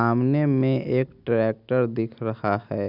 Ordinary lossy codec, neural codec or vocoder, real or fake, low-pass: none; none; real; 5.4 kHz